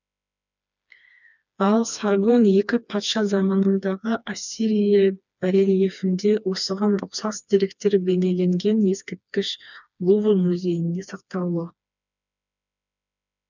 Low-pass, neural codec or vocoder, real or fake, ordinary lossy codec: 7.2 kHz; codec, 16 kHz, 2 kbps, FreqCodec, smaller model; fake; none